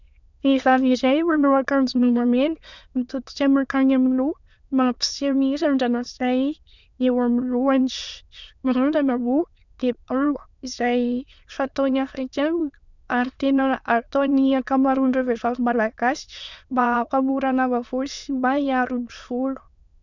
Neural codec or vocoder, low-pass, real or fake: autoencoder, 22.05 kHz, a latent of 192 numbers a frame, VITS, trained on many speakers; 7.2 kHz; fake